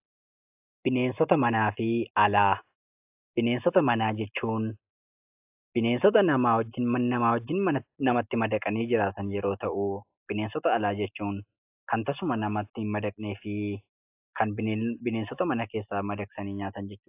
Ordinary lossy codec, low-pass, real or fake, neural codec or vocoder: AAC, 32 kbps; 3.6 kHz; real; none